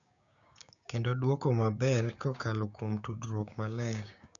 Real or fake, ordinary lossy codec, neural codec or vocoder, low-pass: fake; none; codec, 16 kHz, 6 kbps, DAC; 7.2 kHz